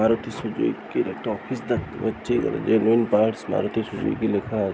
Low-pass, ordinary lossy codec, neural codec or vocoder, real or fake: none; none; none; real